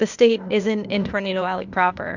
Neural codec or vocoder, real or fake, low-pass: codec, 16 kHz, 0.8 kbps, ZipCodec; fake; 7.2 kHz